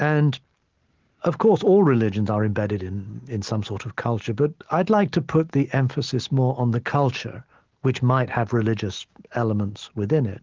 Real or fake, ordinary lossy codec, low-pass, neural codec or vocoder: real; Opus, 16 kbps; 7.2 kHz; none